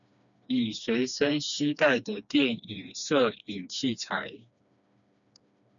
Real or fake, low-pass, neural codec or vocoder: fake; 7.2 kHz; codec, 16 kHz, 2 kbps, FreqCodec, smaller model